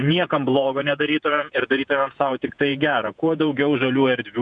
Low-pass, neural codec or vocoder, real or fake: 9.9 kHz; vocoder, 48 kHz, 128 mel bands, Vocos; fake